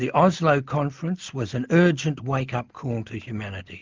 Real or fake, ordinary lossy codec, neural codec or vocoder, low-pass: real; Opus, 32 kbps; none; 7.2 kHz